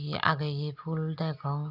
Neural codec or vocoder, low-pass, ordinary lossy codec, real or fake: none; 5.4 kHz; none; real